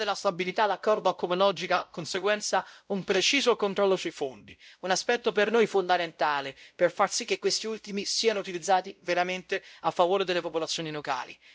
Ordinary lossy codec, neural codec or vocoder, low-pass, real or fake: none; codec, 16 kHz, 0.5 kbps, X-Codec, WavLM features, trained on Multilingual LibriSpeech; none; fake